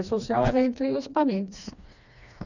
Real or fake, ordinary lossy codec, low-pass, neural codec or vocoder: fake; none; 7.2 kHz; codec, 16 kHz, 2 kbps, FreqCodec, smaller model